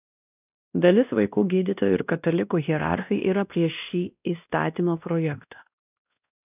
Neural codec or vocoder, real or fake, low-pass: codec, 16 kHz, 1 kbps, X-Codec, WavLM features, trained on Multilingual LibriSpeech; fake; 3.6 kHz